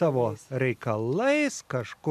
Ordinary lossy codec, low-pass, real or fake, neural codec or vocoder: MP3, 96 kbps; 14.4 kHz; real; none